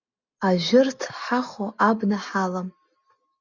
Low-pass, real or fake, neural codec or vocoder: 7.2 kHz; real; none